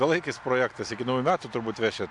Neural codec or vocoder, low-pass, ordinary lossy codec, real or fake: none; 10.8 kHz; AAC, 64 kbps; real